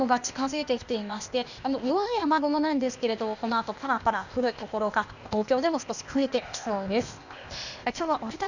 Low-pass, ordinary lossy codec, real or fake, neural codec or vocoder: 7.2 kHz; none; fake; codec, 16 kHz, 0.8 kbps, ZipCodec